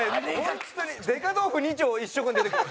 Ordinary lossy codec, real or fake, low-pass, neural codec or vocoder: none; real; none; none